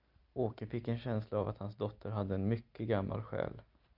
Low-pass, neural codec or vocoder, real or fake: 5.4 kHz; none; real